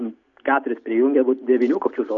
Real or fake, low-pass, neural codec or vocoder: real; 7.2 kHz; none